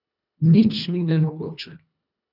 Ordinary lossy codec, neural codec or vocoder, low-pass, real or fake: none; codec, 24 kHz, 1.5 kbps, HILCodec; 5.4 kHz; fake